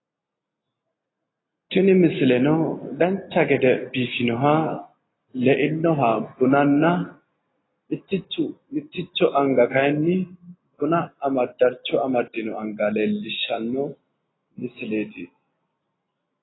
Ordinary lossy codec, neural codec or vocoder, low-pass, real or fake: AAC, 16 kbps; none; 7.2 kHz; real